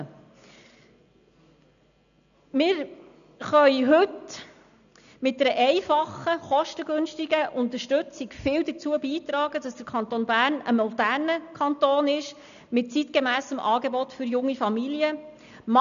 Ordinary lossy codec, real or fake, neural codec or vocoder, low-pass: none; real; none; 7.2 kHz